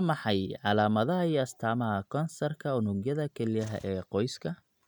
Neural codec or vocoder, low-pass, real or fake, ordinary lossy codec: none; 19.8 kHz; real; none